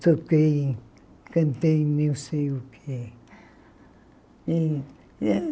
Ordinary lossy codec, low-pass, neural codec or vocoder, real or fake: none; none; none; real